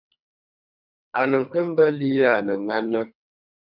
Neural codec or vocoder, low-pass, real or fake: codec, 24 kHz, 3 kbps, HILCodec; 5.4 kHz; fake